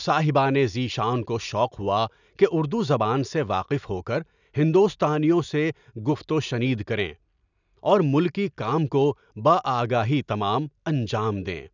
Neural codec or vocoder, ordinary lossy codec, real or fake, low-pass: none; none; real; 7.2 kHz